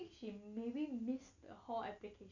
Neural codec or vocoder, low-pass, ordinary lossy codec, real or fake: none; 7.2 kHz; none; real